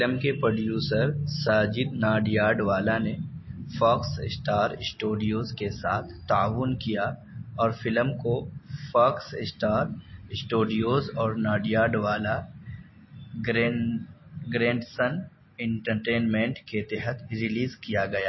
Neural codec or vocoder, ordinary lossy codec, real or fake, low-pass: none; MP3, 24 kbps; real; 7.2 kHz